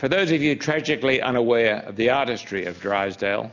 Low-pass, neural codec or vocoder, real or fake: 7.2 kHz; none; real